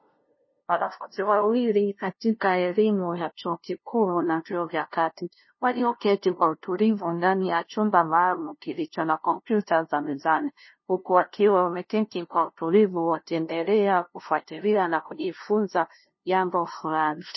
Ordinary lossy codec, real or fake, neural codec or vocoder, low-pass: MP3, 24 kbps; fake; codec, 16 kHz, 0.5 kbps, FunCodec, trained on LibriTTS, 25 frames a second; 7.2 kHz